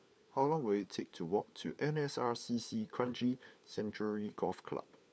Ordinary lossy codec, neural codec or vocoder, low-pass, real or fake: none; codec, 16 kHz, 4 kbps, FunCodec, trained on LibriTTS, 50 frames a second; none; fake